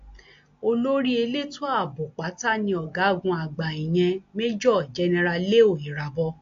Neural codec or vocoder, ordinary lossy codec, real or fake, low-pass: none; AAC, 48 kbps; real; 7.2 kHz